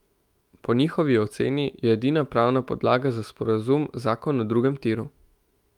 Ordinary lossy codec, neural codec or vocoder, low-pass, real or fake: Opus, 32 kbps; autoencoder, 48 kHz, 128 numbers a frame, DAC-VAE, trained on Japanese speech; 19.8 kHz; fake